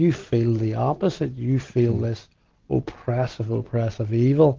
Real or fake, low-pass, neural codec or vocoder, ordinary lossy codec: real; 7.2 kHz; none; Opus, 16 kbps